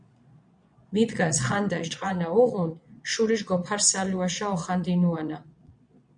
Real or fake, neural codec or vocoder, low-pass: fake; vocoder, 22.05 kHz, 80 mel bands, Vocos; 9.9 kHz